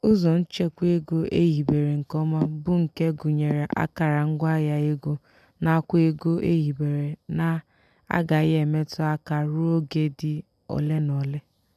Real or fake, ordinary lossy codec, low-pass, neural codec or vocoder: real; none; 14.4 kHz; none